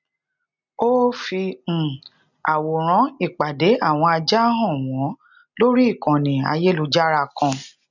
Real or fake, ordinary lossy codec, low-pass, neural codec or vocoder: real; none; 7.2 kHz; none